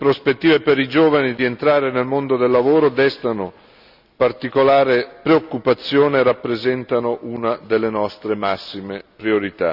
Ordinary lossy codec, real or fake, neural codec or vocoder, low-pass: none; real; none; 5.4 kHz